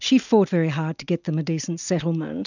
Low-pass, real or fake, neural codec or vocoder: 7.2 kHz; real; none